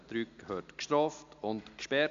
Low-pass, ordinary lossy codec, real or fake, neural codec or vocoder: 7.2 kHz; AAC, 64 kbps; real; none